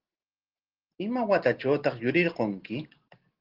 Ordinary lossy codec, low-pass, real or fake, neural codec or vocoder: Opus, 16 kbps; 5.4 kHz; real; none